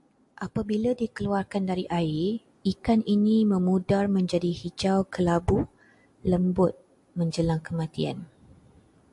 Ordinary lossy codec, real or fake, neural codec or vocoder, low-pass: AAC, 64 kbps; real; none; 10.8 kHz